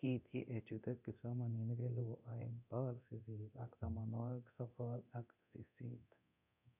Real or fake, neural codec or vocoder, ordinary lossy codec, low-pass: fake; codec, 24 kHz, 0.9 kbps, DualCodec; none; 3.6 kHz